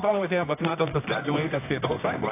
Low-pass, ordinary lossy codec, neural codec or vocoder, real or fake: 3.6 kHz; AAC, 24 kbps; codec, 24 kHz, 0.9 kbps, WavTokenizer, medium music audio release; fake